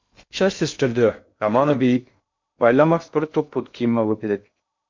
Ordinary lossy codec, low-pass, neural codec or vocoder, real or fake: MP3, 48 kbps; 7.2 kHz; codec, 16 kHz in and 24 kHz out, 0.6 kbps, FocalCodec, streaming, 2048 codes; fake